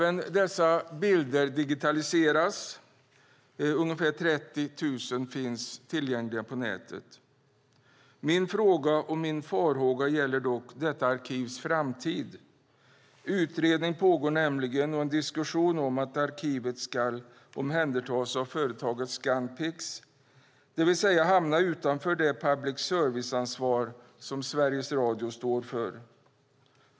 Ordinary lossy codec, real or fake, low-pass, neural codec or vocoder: none; real; none; none